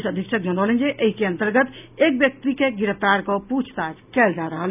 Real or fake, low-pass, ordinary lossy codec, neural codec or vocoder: real; 3.6 kHz; none; none